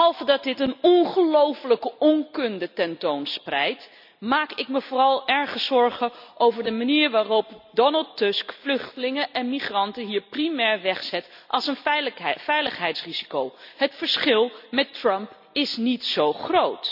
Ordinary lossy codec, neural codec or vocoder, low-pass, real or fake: none; none; 5.4 kHz; real